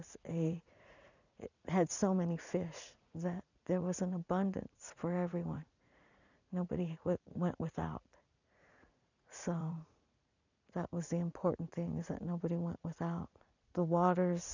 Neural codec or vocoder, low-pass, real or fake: vocoder, 44.1 kHz, 80 mel bands, Vocos; 7.2 kHz; fake